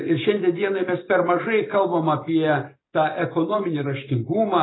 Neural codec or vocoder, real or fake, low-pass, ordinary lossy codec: none; real; 7.2 kHz; AAC, 16 kbps